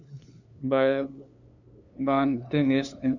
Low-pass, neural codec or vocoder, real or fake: 7.2 kHz; codec, 16 kHz, 2 kbps, FunCodec, trained on LibriTTS, 25 frames a second; fake